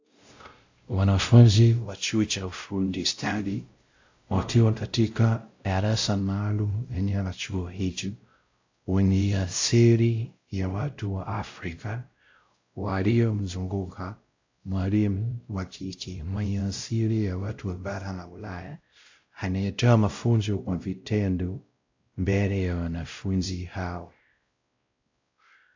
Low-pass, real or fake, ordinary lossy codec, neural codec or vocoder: 7.2 kHz; fake; AAC, 48 kbps; codec, 16 kHz, 0.5 kbps, X-Codec, WavLM features, trained on Multilingual LibriSpeech